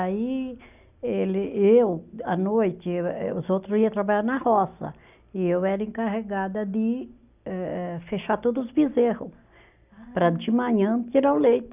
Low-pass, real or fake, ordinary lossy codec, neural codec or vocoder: 3.6 kHz; real; none; none